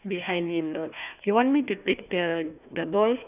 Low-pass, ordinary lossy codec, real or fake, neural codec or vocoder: 3.6 kHz; none; fake; codec, 16 kHz, 1 kbps, FunCodec, trained on Chinese and English, 50 frames a second